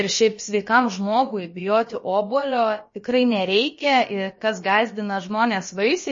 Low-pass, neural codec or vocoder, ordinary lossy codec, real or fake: 7.2 kHz; codec, 16 kHz, about 1 kbps, DyCAST, with the encoder's durations; MP3, 32 kbps; fake